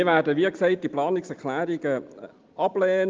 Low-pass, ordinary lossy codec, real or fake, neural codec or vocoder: 7.2 kHz; Opus, 24 kbps; real; none